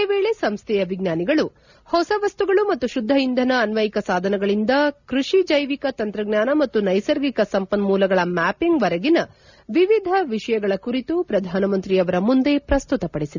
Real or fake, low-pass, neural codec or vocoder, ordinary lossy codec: real; 7.2 kHz; none; none